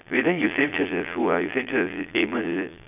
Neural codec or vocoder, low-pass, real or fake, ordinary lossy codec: vocoder, 22.05 kHz, 80 mel bands, Vocos; 3.6 kHz; fake; none